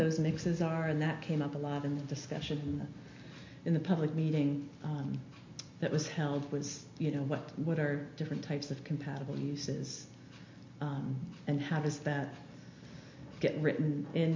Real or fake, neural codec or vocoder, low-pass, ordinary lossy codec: real; none; 7.2 kHz; MP3, 48 kbps